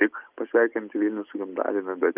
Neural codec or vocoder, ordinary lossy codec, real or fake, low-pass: none; Opus, 24 kbps; real; 3.6 kHz